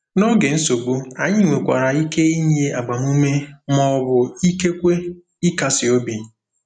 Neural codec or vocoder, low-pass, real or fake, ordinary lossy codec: none; 9.9 kHz; real; none